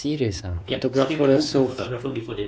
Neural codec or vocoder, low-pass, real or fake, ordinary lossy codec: codec, 16 kHz, 2 kbps, X-Codec, WavLM features, trained on Multilingual LibriSpeech; none; fake; none